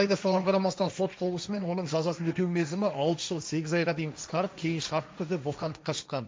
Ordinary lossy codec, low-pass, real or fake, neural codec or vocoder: none; none; fake; codec, 16 kHz, 1.1 kbps, Voila-Tokenizer